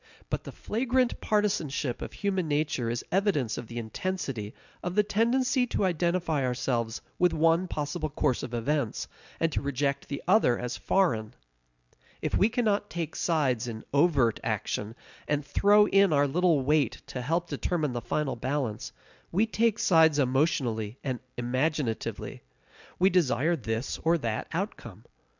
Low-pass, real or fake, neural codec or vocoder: 7.2 kHz; real; none